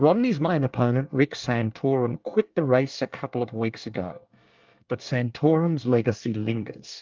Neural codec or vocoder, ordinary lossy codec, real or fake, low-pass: codec, 24 kHz, 1 kbps, SNAC; Opus, 24 kbps; fake; 7.2 kHz